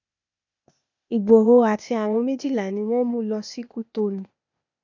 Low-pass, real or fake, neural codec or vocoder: 7.2 kHz; fake; codec, 16 kHz, 0.8 kbps, ZipCodec